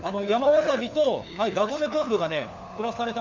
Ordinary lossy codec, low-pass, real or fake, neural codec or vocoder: MP3, 64 kbps; 7.2 kHz; fake; codec, 16 kHz, 4 kbps, FunCodec, trained on LibriTTS, 50 frames a second